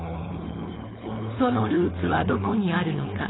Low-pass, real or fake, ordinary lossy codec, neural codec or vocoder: 7.2 kHz; fake; AAC, 16 kbps; codec, 16 kHz, 4.8 kbps, FACodec